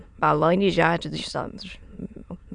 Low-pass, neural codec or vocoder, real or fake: 9.9 kHz; autoencoder, 22.05 kHz, a latent of 192 numbers a frame, VITS, trained on many speakers; fake